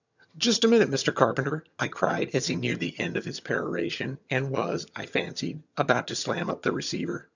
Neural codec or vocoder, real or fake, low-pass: vocoder, 22.05 kHz, 80 mel bands, HiFi-GAN; fake; 7.2 kHz